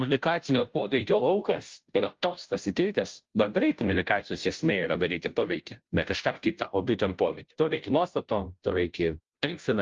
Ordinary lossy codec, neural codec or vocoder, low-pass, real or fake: Opus, 32 kbps; codec, 16 kHz, 0.5 kbps, FunCodec, trained on Chinese and English, 25 frames a second; 7.2 kHz; fake